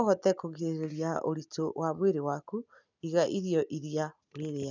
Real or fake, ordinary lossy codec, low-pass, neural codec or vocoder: real; none; 7.2 kHz; none